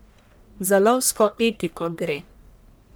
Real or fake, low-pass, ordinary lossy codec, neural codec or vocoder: fake; none; none; codec, 44.1 kHz, 1.7 kbps, Pupu-Codec